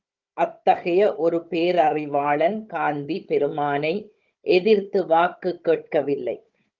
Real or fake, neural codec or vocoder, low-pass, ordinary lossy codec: fake; codec, 16 kHz, 16 kbps, FunCodec, trained on Chinese and English, 50 frames a second; 7.2 kHz; Opus, 24 kbps